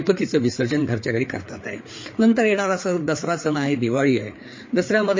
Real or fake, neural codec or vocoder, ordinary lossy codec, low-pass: fake; codec, 16 kHz, 4 kbps, FreqCodec, larger model; MP3, 32 kbps; 7.2 kHz